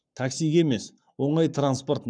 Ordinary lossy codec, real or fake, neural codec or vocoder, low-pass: none; fake; codec, 44.1 kHz, 7.8 kbps, Pupu-Codec; 9.9 kHz